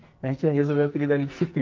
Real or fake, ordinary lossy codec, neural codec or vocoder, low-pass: fake; Opus, 24 kbps; codec, 44.1 kHz, 2.6 kbps, SNAC; 7.2 kHz